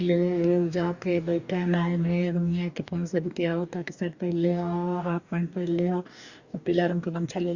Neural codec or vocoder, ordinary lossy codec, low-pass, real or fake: codec, 44.1 kHz, 2.6 kbps, DAC; Opus, 64 kbps; 7.2 kHz; fake